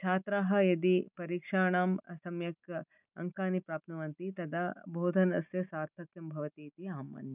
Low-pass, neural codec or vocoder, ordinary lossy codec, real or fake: 3.6 kHz; none; none; real